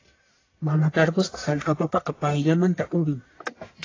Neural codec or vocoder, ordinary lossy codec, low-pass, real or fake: codec, 44.1 kHz, 1.7 kbps, Pupu-Codec; AAC, 32 kbps; 7.2 kHz; fake